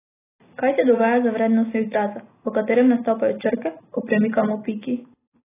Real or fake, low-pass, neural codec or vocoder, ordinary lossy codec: real; 3.6 kHz; none; AAC, 16 kbps